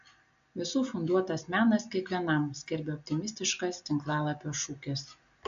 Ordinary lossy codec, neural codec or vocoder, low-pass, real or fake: AAC, 96 kbps; none; 7.2 kHz; real